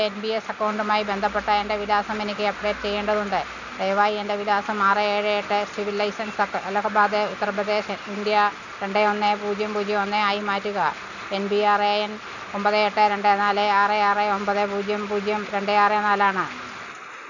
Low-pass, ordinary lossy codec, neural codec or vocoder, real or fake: 7.2 kHz; none; none; real